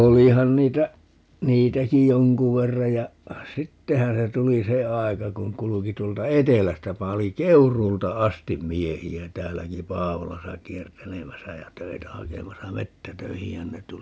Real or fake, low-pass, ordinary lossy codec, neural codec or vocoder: real; none; none; none